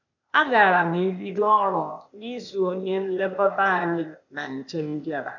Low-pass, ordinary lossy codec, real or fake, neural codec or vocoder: 7.2 kHz; none; fake; codec, 16 kHz, 0.8 kbps, ZipCodec